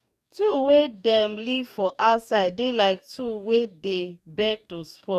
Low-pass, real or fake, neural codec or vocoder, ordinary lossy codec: 14.4 kHz; fake; codec, 44.1 kHz, 2.6 kbps, DAC; none